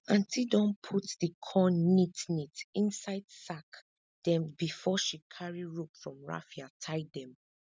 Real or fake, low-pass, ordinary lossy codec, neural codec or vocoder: real; none; none; none